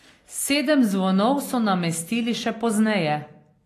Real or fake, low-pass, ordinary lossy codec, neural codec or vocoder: real; 14.4 kHz; AAC, 48 kbps; none